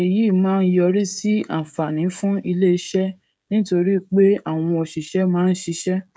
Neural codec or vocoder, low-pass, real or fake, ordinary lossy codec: codec, 16 kHz, 16 kbps, FreqCodec, smaller model; none; fake; none